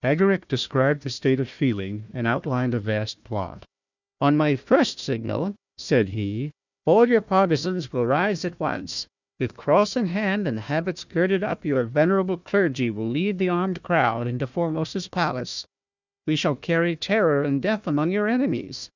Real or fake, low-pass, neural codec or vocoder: fake; 7.2 kHz; codec, 16 kHz, 1 kbps, FunCodec, trained on Chinese and English, 50 frames a second